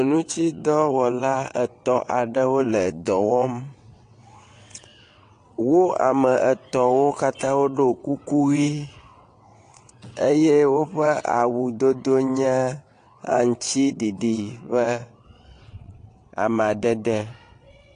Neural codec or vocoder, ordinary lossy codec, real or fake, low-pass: vocoder, 22.05 kHz, 80 mel bands, WaveNeXt; AAC, 48 kbps; fake; 9.9 kHz